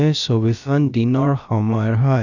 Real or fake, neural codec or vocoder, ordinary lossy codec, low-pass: fake; codec, 16 kHz, about 1 kbps, DyCAST, with the encoder's durations; Opus, 64 kbps; 7.2 kHz